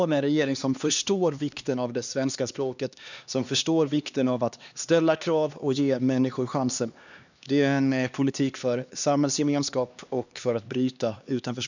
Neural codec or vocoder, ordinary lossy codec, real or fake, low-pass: codec, 16 kHz, 2 kbps, X-Codec, HuBERT features, trained on LibriSpeech; none; fake; 7.2 kHz